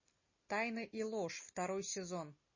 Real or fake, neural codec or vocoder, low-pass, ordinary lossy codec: real; none; 7.2 kHz; MP3, 32 kbps